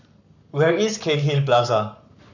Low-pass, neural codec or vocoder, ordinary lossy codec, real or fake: 7.2 kHz; codec, 44.1 kHz, 7.8 kbps, Pupu-Codec; none; fake